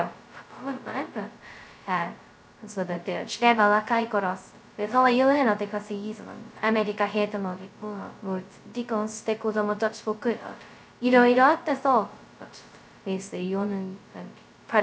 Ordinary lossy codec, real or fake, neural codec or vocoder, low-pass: none; fake; codec, 16 kHz, 0.2 kbps, FocalCodec; none